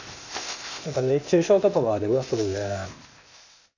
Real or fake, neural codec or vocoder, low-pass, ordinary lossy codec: fake; codec, 16 kHz, 0.8 kbps, ZipCodec; 7.2 kHz; none